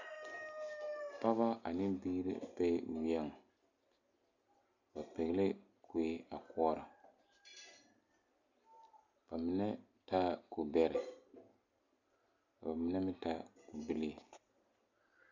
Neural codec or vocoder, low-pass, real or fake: none; 7.2 kHz; real